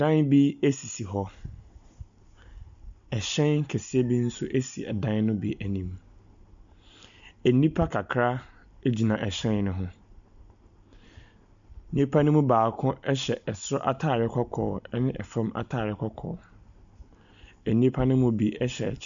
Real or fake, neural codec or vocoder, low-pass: real; none; 7.2 kHz